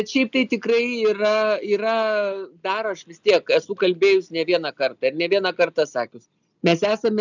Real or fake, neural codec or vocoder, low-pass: real; none; 7.2 kHz